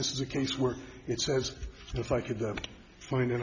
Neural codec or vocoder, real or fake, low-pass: none; real; 7.2 kHz